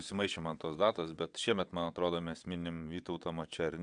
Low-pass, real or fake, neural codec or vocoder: 9.9 kHz; fake; vocoder, 22.05 kHz, 80 mel bands, WaveNeXt